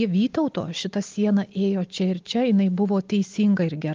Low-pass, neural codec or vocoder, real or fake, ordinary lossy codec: 7.2 kHz; none; real; Opus, 32 kbps